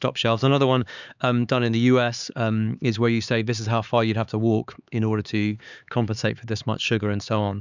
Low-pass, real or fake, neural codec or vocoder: 7.2 kHz; fake; codec, 16 kHz, 4 kbps, X-Codec, HuBERT features, trained on LibriSpeech